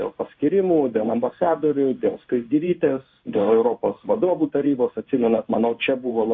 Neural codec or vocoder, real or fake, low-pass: codec, 16 kHz in and 24 kHz out, 1 kbps, XY-Tokenizer; fake; 7.2 kHz